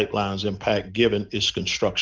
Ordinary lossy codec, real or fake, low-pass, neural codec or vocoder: Opus, 24 kbps; real; 7.2 kHz; none